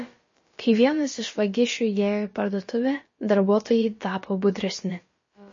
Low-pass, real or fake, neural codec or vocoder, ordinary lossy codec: 7.2 kHz; fake; codec, 16 kHz, about 1 kbps, DyCAST, with the encoder's durations; MP3, 32 kbps